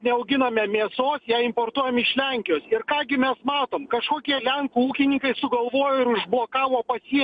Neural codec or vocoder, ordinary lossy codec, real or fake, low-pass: none; MP3, 64 kbps; real; 9.9 kHz